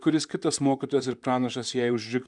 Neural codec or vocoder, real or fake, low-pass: vocoder, 44.1 kHz, 128 mel bands, Pupu-Vocoder; fake; 10.8 kHz